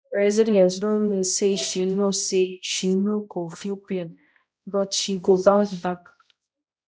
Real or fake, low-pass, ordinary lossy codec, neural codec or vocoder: fake; none; none; codec, 16 kHz, 0.5 kbps, X-Codec, HuBERT features, trained on balanced general audio